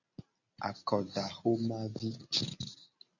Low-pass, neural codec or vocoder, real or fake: 7.2 kHz; none; real